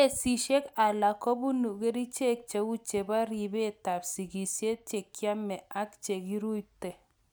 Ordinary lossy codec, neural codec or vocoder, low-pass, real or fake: none; none; none; real